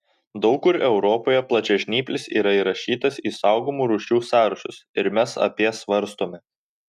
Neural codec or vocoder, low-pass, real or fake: none; 14.4 kHz; real